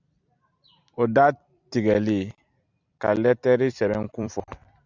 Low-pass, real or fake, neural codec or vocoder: 7.2 kHz; fake; vocoder, 44.1 kHz, 128 mel bands every 256 samples, BigVGAN v2